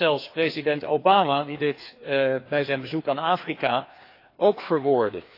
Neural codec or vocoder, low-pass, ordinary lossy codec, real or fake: codec, 16 kHz, 2 kbps, FreqCodec, larger model; 5.4 kHz; AAC, 32 kbps; fake